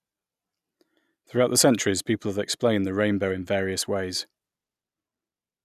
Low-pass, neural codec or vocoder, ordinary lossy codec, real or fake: 14.4 kHz; none; none; real